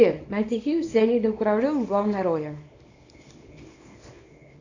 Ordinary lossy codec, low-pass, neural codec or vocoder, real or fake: AAC, 48 kbps; 7.2 kHz; codec, 24 kHz, 0.9 kbps, WavTokenizer, small release; fake